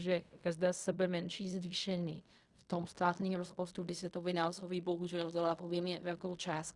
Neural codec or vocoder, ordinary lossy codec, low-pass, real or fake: codec, 16 kHz in and 24 kHz out, 0.4 kbps, LongCat-Audio-Codec, fine tuned four codebook decoder; Opus, 64 kbps; 10.8 kHz; fake